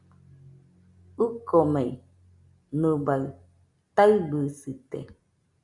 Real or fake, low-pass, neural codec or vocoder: real; 10.8 kHz; none